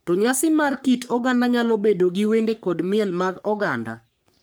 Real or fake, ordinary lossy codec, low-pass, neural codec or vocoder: fake; none; none; codec, 44.1 kHz, 3.4 kbps, Pupu-Codec